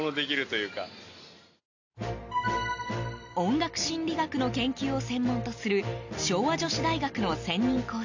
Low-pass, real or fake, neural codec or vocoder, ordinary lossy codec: 7.2 kHz; real; none; AAC, 48 kbps